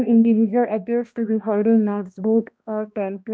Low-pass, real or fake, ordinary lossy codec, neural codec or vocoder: none; fake; none; codec, 16 kHz, 1 kbps, X-Codec, HuBERT features, trained on balanced general audio